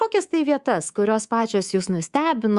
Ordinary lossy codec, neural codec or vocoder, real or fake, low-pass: Opus, 64 kbps; codec, 24 kHz, 3.1 kbps, DualCodec; fake; 10.8 kHz